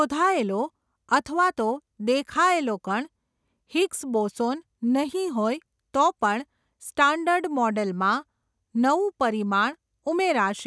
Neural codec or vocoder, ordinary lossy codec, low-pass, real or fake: none; none; none; real